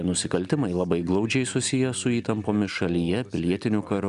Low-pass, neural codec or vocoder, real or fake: 10.8 kHz; none; real